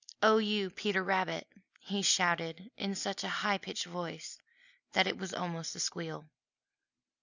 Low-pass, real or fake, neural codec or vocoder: 7.2 kHz; real; none